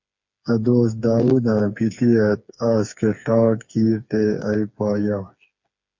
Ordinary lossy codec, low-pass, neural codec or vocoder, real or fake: MP3, 48 kbps; 7.2 kHz; codec, 16 kHz, 4 kbps, FreqCodec, smaller model; fake